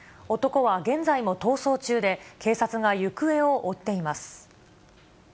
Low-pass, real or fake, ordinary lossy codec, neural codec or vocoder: none; real; none; none